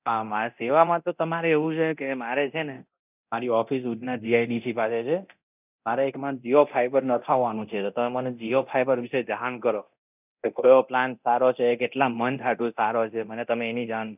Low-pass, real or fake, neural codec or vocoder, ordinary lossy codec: 3.6 kHz; fake; codec, 24 kHz, 0.9 kbps, DualCodec; none